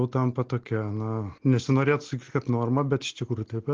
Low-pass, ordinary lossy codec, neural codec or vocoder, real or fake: 7.2 kHz; Opus, 16 kbps; none; real